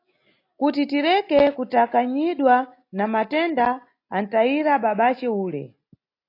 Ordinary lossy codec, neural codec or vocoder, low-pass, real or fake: AAC, 32 kbps; none; 5.4 kHz; real